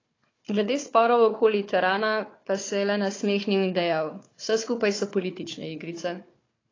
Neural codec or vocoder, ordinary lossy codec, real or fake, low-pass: codec, 16 kHz, 4 kbps, FunCodec, trained on Chinese and English, 50 frames a second; AAC, 32 kbps; fake; 7.2 kHz